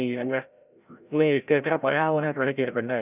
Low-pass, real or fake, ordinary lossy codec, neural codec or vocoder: 3.6 kHz; fake; none; codec, 16 kHz, 0.5 kbps, FreqCodec, larger model